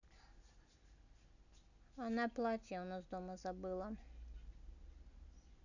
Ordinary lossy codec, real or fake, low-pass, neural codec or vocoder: none; real; 7.2 kHz; none